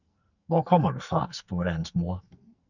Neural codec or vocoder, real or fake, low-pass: codec, 44.1 kHz, 2.6 kbps, SNAC; fake; 7.2 kHz